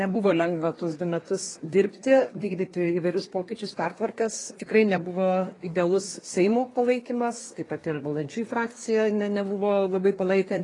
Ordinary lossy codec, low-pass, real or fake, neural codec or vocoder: AAC, 32 kbps; 10.8 kHz; fake; codec, 24 kHz, 1 kbps, SNAC